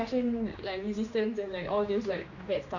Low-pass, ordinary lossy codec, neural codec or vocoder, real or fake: 7.2 kHz; none; codec, 16 kHz, 2 kbps, X-Codec, HuBERT features, trained on general audio; fake